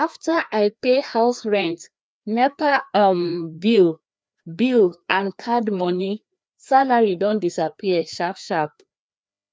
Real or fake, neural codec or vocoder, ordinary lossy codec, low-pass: fake; codec, 16 kHz, 2 kbps, FreqCodec, larger model; none; none